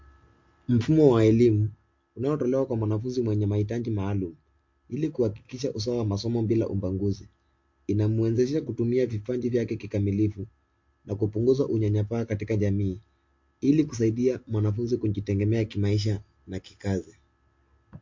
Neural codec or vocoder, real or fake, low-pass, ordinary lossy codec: none; real; 7.2 kHz; MP3, 48 kbps